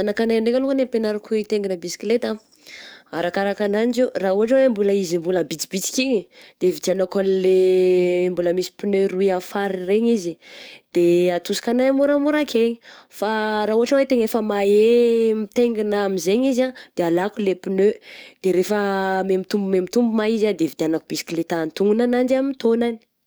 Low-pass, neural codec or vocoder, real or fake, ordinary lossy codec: none; codec, 44.1 kHz, 7.8 kbps, DAC; fake; none